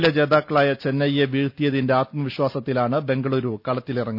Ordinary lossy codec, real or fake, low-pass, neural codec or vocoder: none; real; 5.4 kHz; none